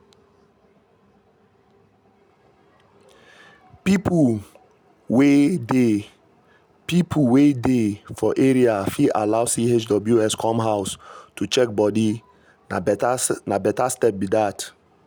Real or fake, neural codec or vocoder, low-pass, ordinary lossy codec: real; none; none; none